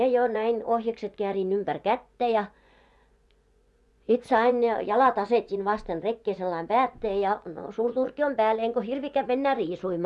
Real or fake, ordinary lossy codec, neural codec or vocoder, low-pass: fake; none; vocoder, 24 kHz, 100 mel bands, Vocos; none